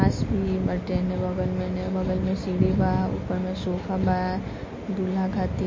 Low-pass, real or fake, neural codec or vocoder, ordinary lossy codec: 7.2 kHz; real; none; MP3, 32 kbps